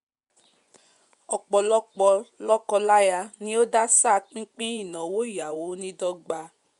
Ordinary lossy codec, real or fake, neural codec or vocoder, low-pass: none; fake; vocoder, 24 kHz, 100 mel bands, Vocos; 10.8 kHz